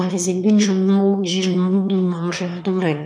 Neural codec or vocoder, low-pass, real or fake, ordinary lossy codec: autoencoder, 22.05 kHz, a latent of 192 numbers a frame, VITS, trained on one speaker; none; fake; none